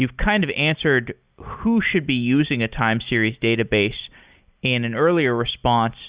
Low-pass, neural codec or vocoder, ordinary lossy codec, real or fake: 3.6 kHz; none; Opus, 64 kbps; real